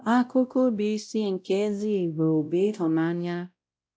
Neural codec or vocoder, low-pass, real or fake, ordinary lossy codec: codec, 16 kHz, 0.5 kbps, X-Codec, WavLM features, trained on Multilingual LibriSpeech; none; fake; none